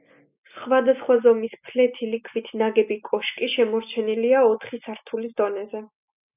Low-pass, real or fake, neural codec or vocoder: 3.6 kHz; real; none